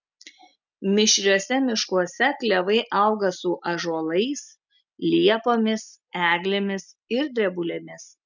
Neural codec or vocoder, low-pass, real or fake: none; 7.2 kHz; real